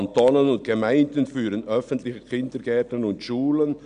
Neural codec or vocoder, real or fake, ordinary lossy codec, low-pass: none; real; none; 9.9 kHz